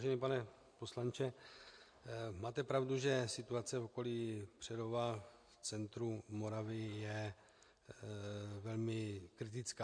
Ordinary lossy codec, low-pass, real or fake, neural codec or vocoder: MP3, 48 kbps; 9.9 kHz; real; none